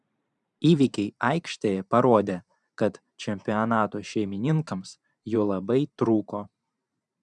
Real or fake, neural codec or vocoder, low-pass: fake; vocoder, 44.1 kHz, 128 mel bands every 512 samples, BigVGAN v2; 10.8 kHz